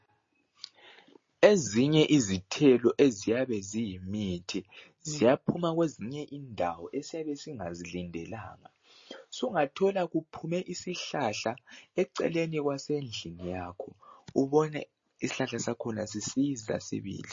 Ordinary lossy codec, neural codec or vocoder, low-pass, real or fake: MP3, 32 kbps; none; 7.2 kHz; real